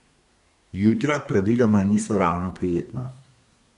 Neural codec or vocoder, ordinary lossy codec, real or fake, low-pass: codec, 24 kHz, 1 kbps, SNAC; none; fake; 10.8 kHz